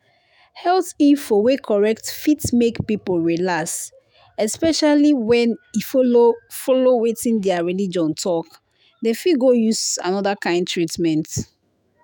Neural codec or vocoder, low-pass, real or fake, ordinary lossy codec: autoencoder, 48 kHz, 128 numbers a frame, DAC-VAE, trained on Japanese speech; none; fake; none